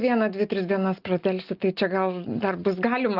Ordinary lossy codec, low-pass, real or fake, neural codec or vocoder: Opus, 32 kbps; 5.4 kHz; real; none